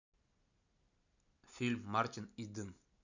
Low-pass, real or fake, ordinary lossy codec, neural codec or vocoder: 7.2 kHz; real; none; none